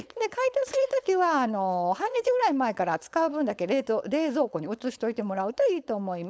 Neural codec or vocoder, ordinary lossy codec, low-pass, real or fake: codec, 16 kHz, 4.8 kbps, FACodec; none; none; fake